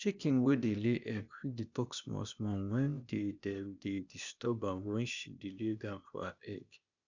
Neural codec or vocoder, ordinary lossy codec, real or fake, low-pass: codec, 16 kHz, 0.8 kbps, ZipCodec; none; fake; 7.2 kHz